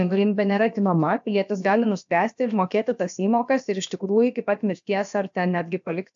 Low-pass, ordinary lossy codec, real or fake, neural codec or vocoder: 7.2 kHz; AAC, 64 kbps; fake; codec, 16 kHz, about 1 kbps, DyCAST, with the encoder's durations